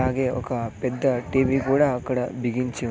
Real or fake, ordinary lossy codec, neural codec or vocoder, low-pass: real; none; none; none